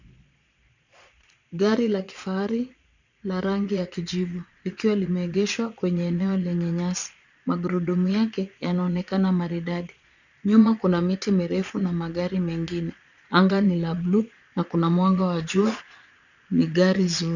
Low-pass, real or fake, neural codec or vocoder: 7.2 kHz; fake; vocoder, 22.05 kHz, 80 mel bands, Vocos